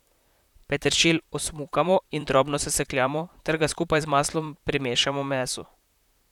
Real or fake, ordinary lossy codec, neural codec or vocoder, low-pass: fake; none; vocoder, 44.1 kHz, 128 mel bands, Pupu-Vocoder; 19.8 kHz